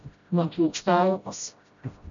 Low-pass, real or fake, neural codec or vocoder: 7.2 kHz; fake; codec, 16 kHz, 0.5 kbps, FreqCodec, smaller model